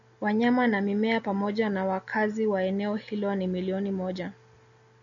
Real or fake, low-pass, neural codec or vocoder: real; 7.2 kHz; none